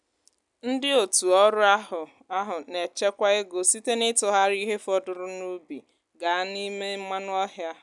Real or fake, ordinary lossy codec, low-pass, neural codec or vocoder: real; none; 10.8 kHz; none